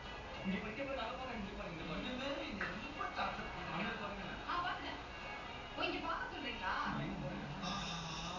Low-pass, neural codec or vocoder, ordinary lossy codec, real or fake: 7.2 kHz; none; none; real